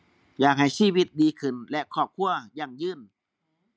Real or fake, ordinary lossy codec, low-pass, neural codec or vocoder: real; none; none; none